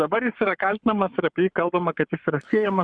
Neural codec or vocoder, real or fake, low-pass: codec, 44.1 kHz, 7.8 kbps, Pupu-Codec; fake; 9.9 kHz